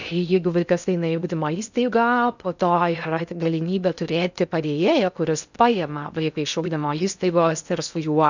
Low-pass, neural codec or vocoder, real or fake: 7.2 kHz; codec, 16 kHz in and 24 kHz out, 0.6 kbps, FocalCodec, streaming, 2048 codes; fake